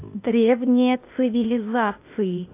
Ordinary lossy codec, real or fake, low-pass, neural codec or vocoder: AAC, 24 kbps; fake; 3.6 kHz; codec, 16 kHz, 0.8 kbps, ZipCodec